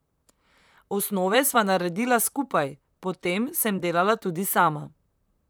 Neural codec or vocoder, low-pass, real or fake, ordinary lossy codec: vocoder, 44.1 kHz, 128 mel bands, Pupu-Vocoder; none; fake; none